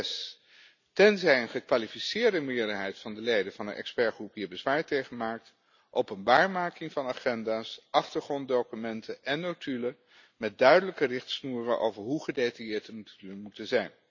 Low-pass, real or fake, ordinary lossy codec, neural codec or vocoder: 7.2 kHz; real; none; none